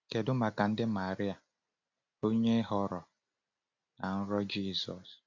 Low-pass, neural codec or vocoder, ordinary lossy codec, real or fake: 7.2 kHz; none; AAC, 48 kbps; real